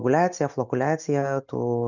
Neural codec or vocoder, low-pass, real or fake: none; 7.2 kHz; real